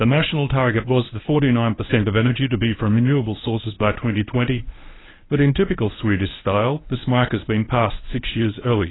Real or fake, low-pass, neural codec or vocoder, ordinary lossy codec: fake; 7.2 kHz; codec, 24 kHz, 0.9 kbps, WavTokenizer, medium speech release version 1; AAC, 16 kbps